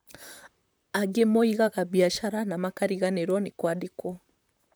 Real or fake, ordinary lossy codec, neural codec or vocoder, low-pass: fake; none; vocoder, 44.1 kHz, 128 mel bands, Pupu-Vocoder; none